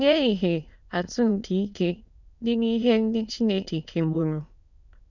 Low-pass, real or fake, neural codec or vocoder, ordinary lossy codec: 7.2 kHz; fake; autoencoder, 22.05 kHz, a latent of 192 numbers a frame, VITS, trained on many speakers; none